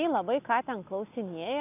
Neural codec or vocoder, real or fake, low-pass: none; real; 3.6 kHz